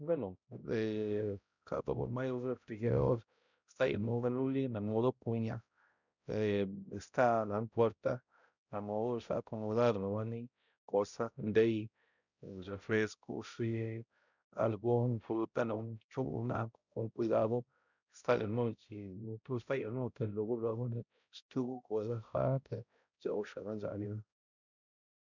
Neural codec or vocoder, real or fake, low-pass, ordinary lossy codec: codec, 16 kHz, 0.5 kbps, X-Codec, HuBERT features, trained on balanced general audio; fake; 7.2 kHz; none